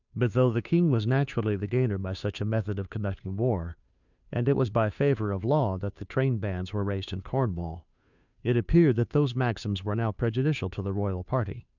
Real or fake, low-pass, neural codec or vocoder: fake; 7.2 kHz; codec, 16 kHz, 2 kbps, FunCodec, trained on Chinese and English, 25 frames a second